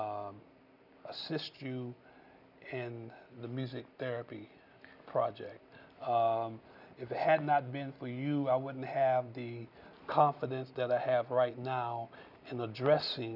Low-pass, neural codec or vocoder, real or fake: 5.4 kHz; none; real